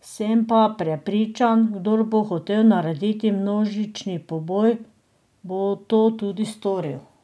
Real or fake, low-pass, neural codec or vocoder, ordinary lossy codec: real; none; none; none